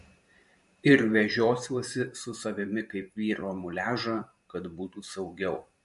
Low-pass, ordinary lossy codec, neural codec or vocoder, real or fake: 14.4 kHz; MP3, 48 kbps; vocoder, 44.1 kHz, 128 mel bands every 512 samples, BigVGAN v2; fake